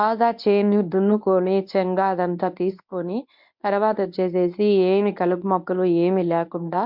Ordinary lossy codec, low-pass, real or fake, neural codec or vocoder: MP3, 48 kbps; 5.4 kHz; fake; codec, 24 kHz, 0.9 kbps, WavTokenizer, medium speech release version 1